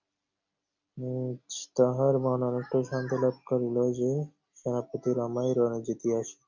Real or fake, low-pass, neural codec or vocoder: real; 7.2 kHz; none